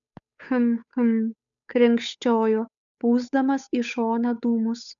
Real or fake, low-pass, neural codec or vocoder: fake; 7.2 kHz; codec, 16 kHz, 8 kbps, FunCodec, trained on Chinese and English, 25 frames a second